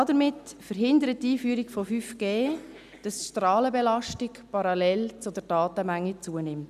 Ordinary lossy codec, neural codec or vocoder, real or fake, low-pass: none; none; real; 14.4 kHz